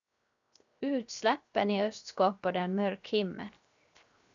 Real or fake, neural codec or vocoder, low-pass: fake; codec, 16 kHz, 0.7 kbps, FocalCodec; 7.2 kHz